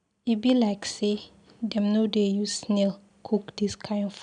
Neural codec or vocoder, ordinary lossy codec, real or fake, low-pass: none; none; real; 9.9 kHz